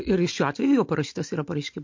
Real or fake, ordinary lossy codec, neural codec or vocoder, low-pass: real; MP3, 48 kbps; none; 7.2 kHz